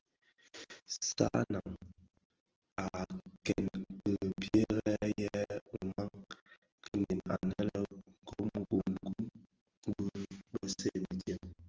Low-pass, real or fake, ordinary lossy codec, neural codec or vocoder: 7.2 kHz; real; Opus, 16 kbps; none